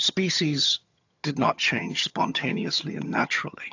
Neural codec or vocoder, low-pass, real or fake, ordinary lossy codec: vocoder, 22.05 kHz, 80 mel bands, HiFi-GAN; 7.2 kHz; fake; AAC, 48 kbps